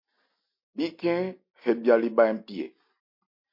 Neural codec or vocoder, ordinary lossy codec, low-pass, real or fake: none; MP3, 32 kbps; 5.4 kHz; real